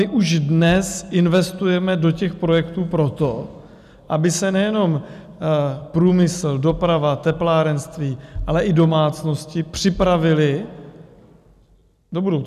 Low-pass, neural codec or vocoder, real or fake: 14.4 kHz; none; real